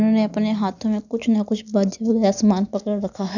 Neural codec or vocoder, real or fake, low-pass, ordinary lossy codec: none; real; 7.2 kHz; none